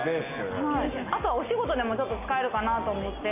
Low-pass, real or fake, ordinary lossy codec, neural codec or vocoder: 3.6 kHz; real; AAC, 32 kbps; none